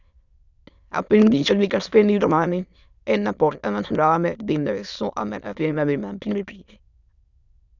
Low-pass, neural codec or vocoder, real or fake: 7.2 kHz; autoencoder, 22.05 kHz, a latent of 192 numbers a frame, VITS, trained on many speakers; fake